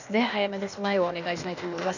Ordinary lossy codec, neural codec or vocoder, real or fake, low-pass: none; codec, 16 kHz, 0.8 kbps, ZipCodec; fake; 7.2 kHz